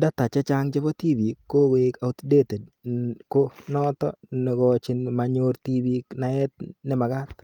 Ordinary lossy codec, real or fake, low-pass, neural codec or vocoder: Opus, 32 kbps; real; 19.8 kHz; none